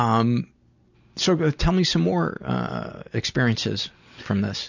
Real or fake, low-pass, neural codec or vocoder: real; 7.2 kHz; none